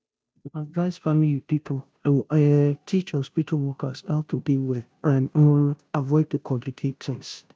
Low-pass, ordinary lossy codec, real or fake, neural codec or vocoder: none; none; fake; codec, 16 kHz, 0.5 kbps, FunCodec, trained on Chinese and English, 25 frames a second